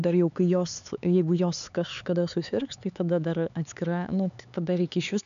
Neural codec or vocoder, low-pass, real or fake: codec, 16 kHz, 4 kbps, X-Codec, HuBERT features, trained on LibriSpeech; 7.2 kHz; fake